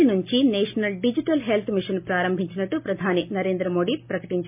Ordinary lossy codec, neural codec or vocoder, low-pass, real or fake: MP3, 32 kbps; none; 3.6 kHz; real